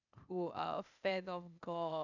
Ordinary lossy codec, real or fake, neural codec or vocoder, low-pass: none; fake; codec, 16 kHz, 0.8 kbps, ZipCodec; 7.2 kHz